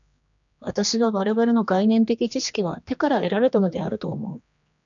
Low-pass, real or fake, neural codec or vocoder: 7.2 kHz; fake; codec, 16 kHz, 2 kbps, X-Codec, HuBERT features, trained on general audio